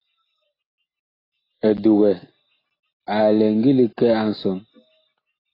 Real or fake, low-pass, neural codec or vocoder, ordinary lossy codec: real; 5.4 kHz; none; AAC, 24 kbps